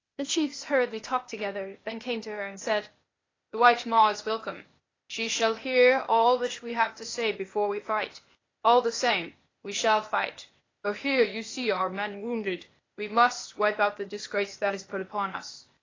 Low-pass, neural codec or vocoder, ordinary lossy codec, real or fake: 7.2 kHz; codec, 16 kHz, 0.8 kbps, ZipCodec; AAC, 32 kbps; fake